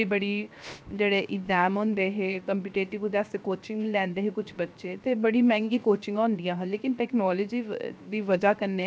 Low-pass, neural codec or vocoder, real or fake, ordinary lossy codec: none; codec, 16 kHz, 0.7 kbps, FocalCodec; fake; none